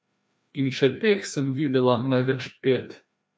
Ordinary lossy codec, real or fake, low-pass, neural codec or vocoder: none; fake; none; codec, 16 kHz, 1 kbps, FreqCodec, larger model